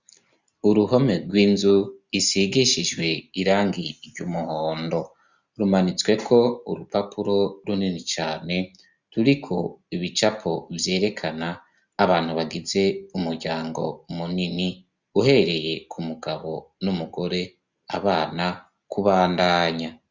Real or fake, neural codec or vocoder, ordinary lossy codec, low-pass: real; none; Opus, 64 kbps; 7.2 kHz